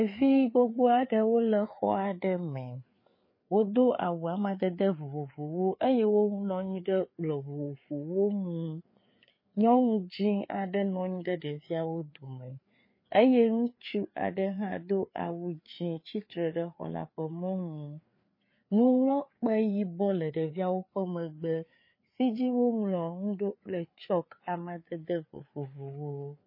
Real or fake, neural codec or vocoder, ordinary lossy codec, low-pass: fake; codec, 16 kHz, 4 kbps, FreqCodec, larger model; MP3, 24 kbps; 5.4 kHz